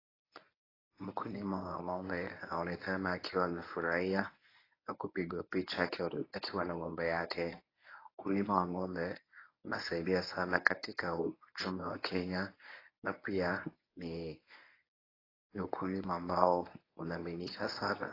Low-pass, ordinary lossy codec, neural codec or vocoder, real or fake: 5.4 kHz; AAC, 24 kbps; codec, 24 kHz, 0.9 kbps, WavTokenizer, medium speech release version 1; fake